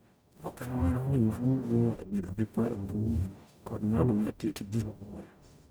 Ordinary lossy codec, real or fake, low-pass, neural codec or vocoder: none; fake; none; codec, 44.1 kHz, 0.9 kbps, DAC